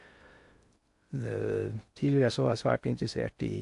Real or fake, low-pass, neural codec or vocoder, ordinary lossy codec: fake; 10.8 kHz; codec, 16 kHz in and 24 kHz out, 0.6 kbps, FocalCodec, streaming, 2048 codes; AAC, 64 kbps